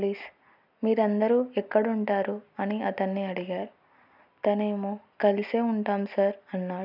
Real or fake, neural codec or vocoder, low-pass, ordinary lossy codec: real; none; 5.4 kHz; none